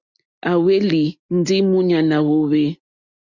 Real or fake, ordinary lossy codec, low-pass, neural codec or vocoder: real; Opus, 64 kbps; 7.2 kHz; none